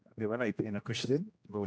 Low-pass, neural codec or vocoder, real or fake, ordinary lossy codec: none; codec, 16 kHz, 1 kbps, X-Codec, HuBERT features, trained on general audio; fake; none